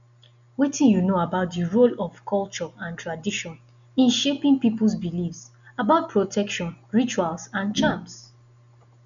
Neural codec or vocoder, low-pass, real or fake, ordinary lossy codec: none; 7.2 kHz; real; none